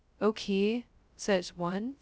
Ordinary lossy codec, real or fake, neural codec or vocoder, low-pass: none; fake; codec, 16 kHz, 0.2 kbps, FocalCodec; none